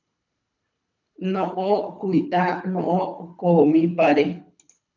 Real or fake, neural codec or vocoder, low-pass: fake; codec, 24 kHz, 3 kbps, HILCodec; 7.2 kHz